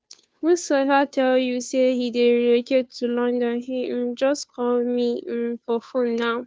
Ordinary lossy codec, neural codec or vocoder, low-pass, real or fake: none; codec, 16 kHz, 2 kbps, FunCodec, trained on Chinese and English, 25 frames a second; none; fake